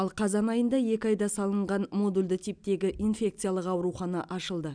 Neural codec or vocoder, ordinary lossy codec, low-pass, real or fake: none; none; 9.9 kHz; real